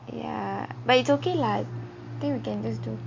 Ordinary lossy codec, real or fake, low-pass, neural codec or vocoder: MP3, 48 kbps; real; 7.2 kHz; none